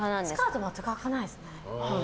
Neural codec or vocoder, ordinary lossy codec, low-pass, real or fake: none; none; none; real